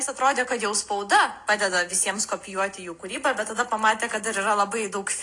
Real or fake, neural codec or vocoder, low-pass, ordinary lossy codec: real; none; 10.8 kHz; AAC, 48 kbps